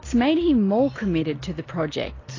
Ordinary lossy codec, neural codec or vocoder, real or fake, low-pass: AAC, 32 kbps; none; real; 7.2 kHz